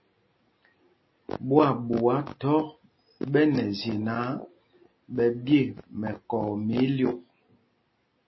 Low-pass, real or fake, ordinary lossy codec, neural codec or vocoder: 7.2 kHz; real; MP3, 24 kbps; none